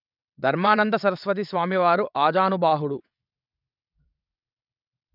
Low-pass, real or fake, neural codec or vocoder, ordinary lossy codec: 5.4 kHz; fake; vocoder, 22.05 kHz, 80 mel bands, WaveNeXt; none